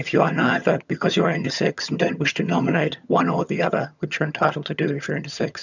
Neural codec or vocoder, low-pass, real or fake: vocoder, 22.05 kHz, 80 mel bands, HiFi-GAN; 7.2 kHz; fake